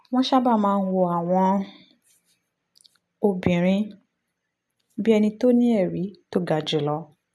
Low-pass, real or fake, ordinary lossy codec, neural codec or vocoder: none; real; none; none